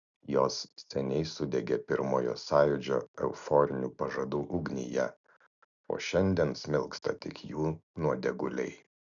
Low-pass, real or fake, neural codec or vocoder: 7.2 kHz; real; none